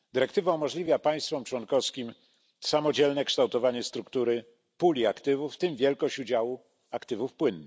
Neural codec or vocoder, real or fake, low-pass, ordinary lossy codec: none; real; none; none